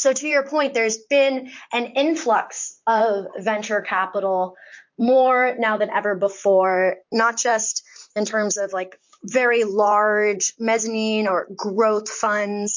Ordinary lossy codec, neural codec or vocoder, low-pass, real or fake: MP3, 48 kbps; vocoder, 44.1 kHz, 128 mel bands, Pupu-Vocoder; 7.2 kHz; fake